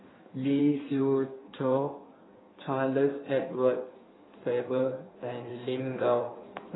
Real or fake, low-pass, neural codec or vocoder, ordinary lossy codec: fake; 7.2 kHz; codec, 16 kHz in and 24 kHz out, 1.1 kbps, FireRedTTS-2 codec; AAC, 16 kbps